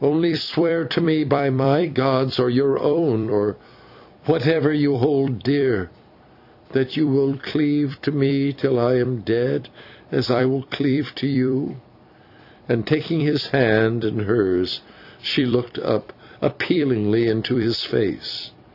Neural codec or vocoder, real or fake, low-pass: vocoder, 44.1 kHz, 128 mel bands every 256 samples, BigVGAN v2; fake; 5.4 kHz